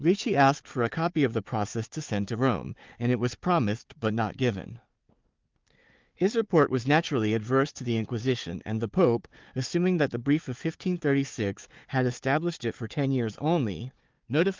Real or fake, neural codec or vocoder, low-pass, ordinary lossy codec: fake; codec, 16 kHz, 4 kbps, FunCodec, trained on Chinese and English, 50 frames a second; 7.2 kHz; Opus, 32 kbps